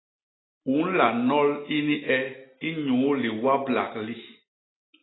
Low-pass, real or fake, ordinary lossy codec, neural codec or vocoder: 7.2 kHz; real; AAC, 16 kbps; none